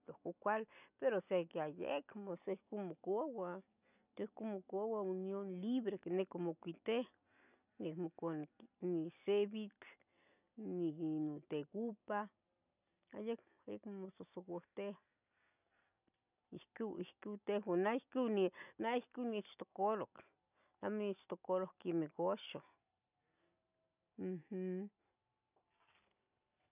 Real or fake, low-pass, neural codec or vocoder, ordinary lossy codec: real; 3.6 kHz; none; none